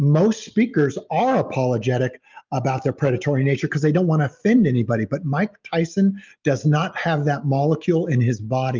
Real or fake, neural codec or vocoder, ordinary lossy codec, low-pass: real; none; Opus, 24 kbps; 7.2 kHz